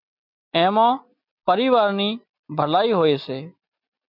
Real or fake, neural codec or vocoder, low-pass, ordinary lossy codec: real; none; 5.4 kHz; MP3, 48 kbps